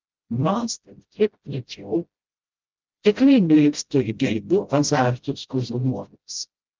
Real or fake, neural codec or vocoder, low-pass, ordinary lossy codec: fake; codec, 16 kHz, 0.5 kbps, FreqCodec, smaller model; 7.2 kHz; Opus, 24 kbps